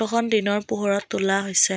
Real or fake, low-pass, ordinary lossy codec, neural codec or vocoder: real; none; none; none